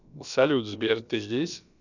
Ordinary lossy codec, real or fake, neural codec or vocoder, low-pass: none; fake; codec, 16 kHz, about 1 kbps, DyCAST, with the encoder's durations; 7.2 kHz